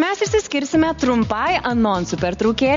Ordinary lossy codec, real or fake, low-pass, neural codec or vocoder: MP3, 48 kbps; real; 7.2 kHz; none